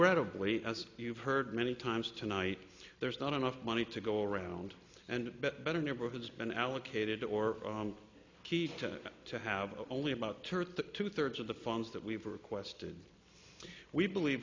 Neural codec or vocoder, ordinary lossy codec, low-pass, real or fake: none; MP3, 64 kbps; 7.2 kHz; real